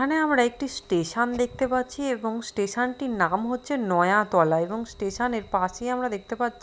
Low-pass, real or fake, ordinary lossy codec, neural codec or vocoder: none; real; none; none